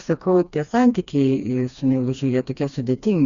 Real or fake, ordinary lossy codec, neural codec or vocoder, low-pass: fake; Opus, 64 kbps; codec, 16 kHz, 2 kbps, FreqCodec, smaller model; 7.2 kHz